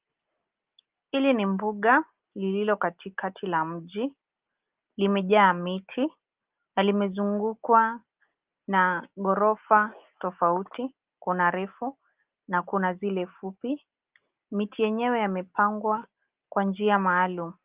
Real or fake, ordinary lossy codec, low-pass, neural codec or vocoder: real; Opus, 32 kbps; 3.6 kHz; none